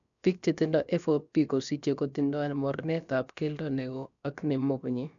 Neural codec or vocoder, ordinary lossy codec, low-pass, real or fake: codec, 16 kHz, about 1 kbps, DyCAST, with the encoder's durations; none; 7.2 kHz; fake